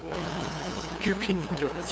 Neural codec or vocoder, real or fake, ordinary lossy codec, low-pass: codec, 16 kHz, 2 kbps, FunCodec, trained on LibriTTS, 25 frames a second; fake; none; none